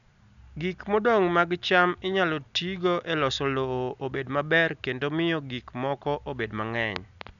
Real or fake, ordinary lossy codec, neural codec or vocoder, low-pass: real; none; none; 7.2 kHz